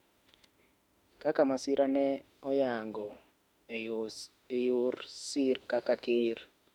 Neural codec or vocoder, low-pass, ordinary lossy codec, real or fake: autoencoder, 48 kHz, 32 numbers a frame, DAC-VAE, trained on Japanese speech; 19.8 kHz; MP3, 96 kbps; fake